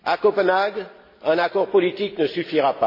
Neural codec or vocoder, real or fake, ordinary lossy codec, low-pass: none; real; MP3, 24 kbps; 5.4 kHz